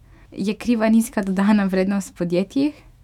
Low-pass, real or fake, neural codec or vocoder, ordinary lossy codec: 19.8 kHz; fake; autoencoder, 48 kHz, 128 numbers a frame, DAC-VAE, trained on Japanese speech; none